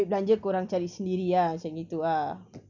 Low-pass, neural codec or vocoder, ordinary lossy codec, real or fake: 7.2 kHz; none; none; real